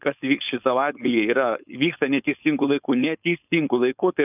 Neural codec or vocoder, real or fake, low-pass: codec, 16 kHz, 4.8 kbps, FACodec; fake; 3.6 kHz